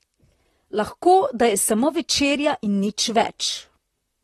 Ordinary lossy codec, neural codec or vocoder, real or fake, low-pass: AAC, 32 kbps; vocoder, 44.1 kHz, 128 mel bands, Pupu-Vocoder; fake; 19.8 kHz